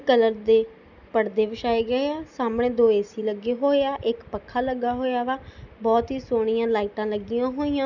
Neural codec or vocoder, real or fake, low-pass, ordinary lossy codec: none; real; 7.2 kHz; none